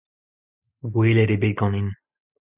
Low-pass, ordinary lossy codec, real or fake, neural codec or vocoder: 3.6 kHz; Opus, 64 kbps; real; none